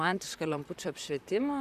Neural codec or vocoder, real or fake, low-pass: vocoder, 44.1 kHz, 128 mel bands, Pupu-Vocoder; fake; 14.4 kHz